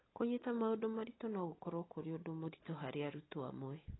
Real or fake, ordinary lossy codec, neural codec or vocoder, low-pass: real; AAC, 16 kbps; none; 7.2 kHz